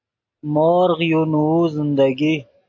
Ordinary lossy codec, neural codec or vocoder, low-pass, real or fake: AAC, 48 kbps; none; 7.2 kHz; real